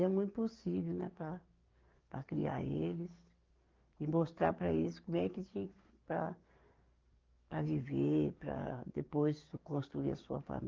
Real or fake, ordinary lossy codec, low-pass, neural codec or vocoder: fake; Opus, 32 kbps; 7.2 kHz; codec, 44.1 kHz, 7.8 kbps, DAC